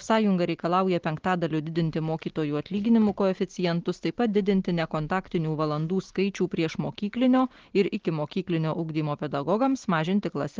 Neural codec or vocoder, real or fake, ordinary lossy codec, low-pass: none; real; Opus, 16 kbps; 7.2 kHz